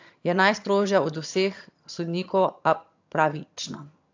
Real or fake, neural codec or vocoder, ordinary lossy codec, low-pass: fake; vocoder, 22.05 kHz, 80 mel bands, HiFi-GAN; AAC, 48 kbps; 7.2 kHz